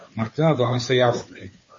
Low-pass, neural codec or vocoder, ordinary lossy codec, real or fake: 7.2 kHz; codec, 16 kHz, 2 kbps, FunCodec, trained on Chinese and English, 25 frames a second; MP3, 32 kbps; fake